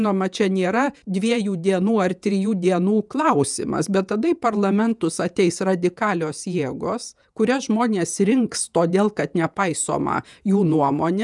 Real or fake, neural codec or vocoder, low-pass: fake; vocoder, 48 kHz, 128 mel bands, Vocos; 10.8 kHz